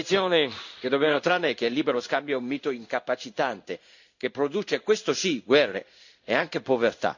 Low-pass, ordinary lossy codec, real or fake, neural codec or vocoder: 7.2 kHz; none; fake; codec, 16 kHz in and 24 kHz out, 1 kbps, XY-Tokenizer